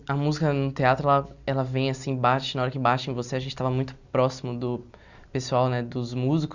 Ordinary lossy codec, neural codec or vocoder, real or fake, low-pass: none; none; real; 7.2 kHz